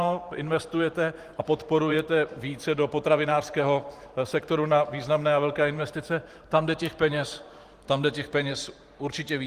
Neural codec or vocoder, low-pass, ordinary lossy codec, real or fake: vocoder, 44.1 kHz, 128 mel bands every 512 samples, BigVGAN v2; 14.4 kHz; Opus, 24 kbps; fake